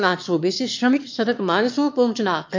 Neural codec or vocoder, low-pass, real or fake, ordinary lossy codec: autoencoder, 22.05 kHz, a latent of 192 numbers a frame, VITS, trained on one speaker; 7.2 kHz; fake; MP3, 48 kbps